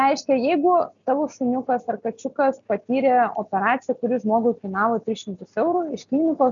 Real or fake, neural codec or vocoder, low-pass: real; none; 7.2 kHz